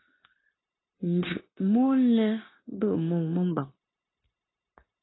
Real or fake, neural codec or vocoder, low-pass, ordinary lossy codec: fake; codec, 16 kHz, 0.9 kbps, LongCat-Audio-Codec; 7.2 kHz; AAC, 16 kbps